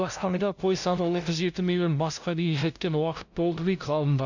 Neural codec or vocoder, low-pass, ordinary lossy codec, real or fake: codec, 16 kHz, 0.5 kbps, FunCodec, trained on LibriTTS, 25 frames a second; 7.2 kHz; none; fake